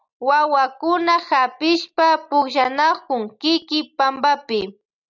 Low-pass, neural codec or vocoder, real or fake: 7.2 kHz; none; real